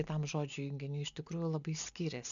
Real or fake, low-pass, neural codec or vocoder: real; 7.2 kHz; none